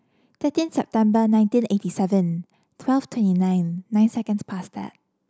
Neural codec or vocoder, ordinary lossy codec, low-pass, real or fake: none; none; none; real